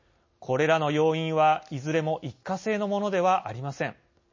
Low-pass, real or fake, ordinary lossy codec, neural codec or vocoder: 7.2 kHz; real; MP3, 32 kbps; none